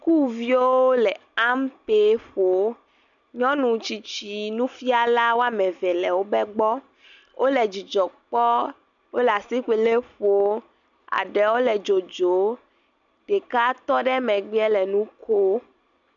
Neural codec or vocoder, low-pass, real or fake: none; 7.2 kHz; real